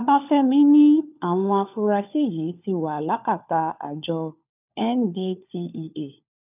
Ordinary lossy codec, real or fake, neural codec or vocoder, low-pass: none; fake; codec, 16 kHz, 4 kbps, FunCodec, trained on LibriTTS, 50 frames a second; 3.6 kHz